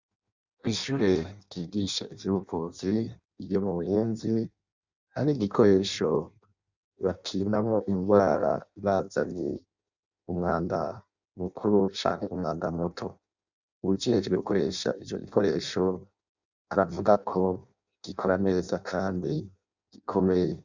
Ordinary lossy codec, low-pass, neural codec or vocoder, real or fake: Opus, 64 kbps; 7.2 kHz; codec, 16 kHz in and 24 kHz out, 0.6 kbps, FireRedTTS-2 codec; fake